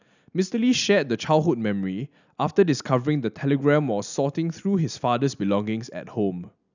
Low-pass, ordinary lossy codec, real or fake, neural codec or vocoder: 7.2 kHz; none; real; none